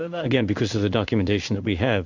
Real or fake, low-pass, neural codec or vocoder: fake; 7.2 kHz; codec, 16 kHz in and 24 kHz out, 1 kbps, XY-Tokenizer